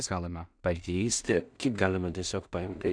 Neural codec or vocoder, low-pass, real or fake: codec, 16 kHz in and 24 kHz out, 0.4 kbps, LongCat-Audio-Codec, two codebook decoder; 9.9 kHz; fake